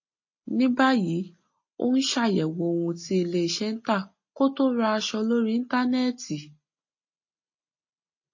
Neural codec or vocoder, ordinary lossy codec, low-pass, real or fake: none; MP3, 32 kbps; 7.2 kHz; real